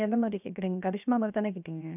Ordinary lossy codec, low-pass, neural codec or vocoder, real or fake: none; 3.6 kHz; codec, 16 kHz, 0.7 kbps, FocalCodec; fake